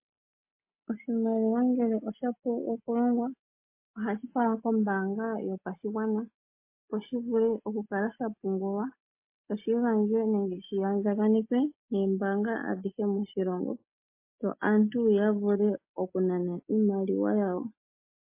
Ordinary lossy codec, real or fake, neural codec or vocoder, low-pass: MP3, 24 kbps; real; none; 3.6 kHz